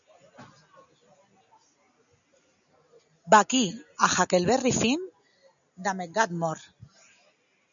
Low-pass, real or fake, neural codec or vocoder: 7.2 kHz; real; none